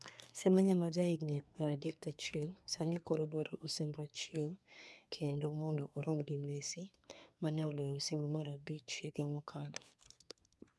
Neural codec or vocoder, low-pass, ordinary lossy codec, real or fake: codec, 24 kHz, 1 kbps, SNAC; none; none; fake